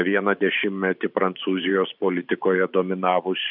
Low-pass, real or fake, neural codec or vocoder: 5.4 kHz; fake; vocoder, 44.1 kHz, 128 mel bands every 512 samples, BigVGAN v2